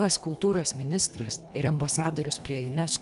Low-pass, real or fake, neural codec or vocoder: 10.8 kHz; fake; codec, 24 kHz, 1.5 kbps, HILCodec